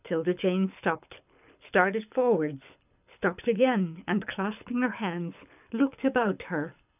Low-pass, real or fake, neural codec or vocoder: 3.6 kHz; fake; codec, 24 kHz, 6 kbps, HILCodec